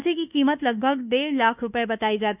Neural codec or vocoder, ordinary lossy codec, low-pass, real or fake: codec, 24 kHz, 1.2 kbps, DualCodec; none; 3.6 kHz; fake